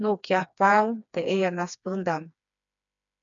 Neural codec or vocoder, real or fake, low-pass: codec, 16 kHz, 2 kbps, FreqCodec, smaller model; fake; 7.2 kHz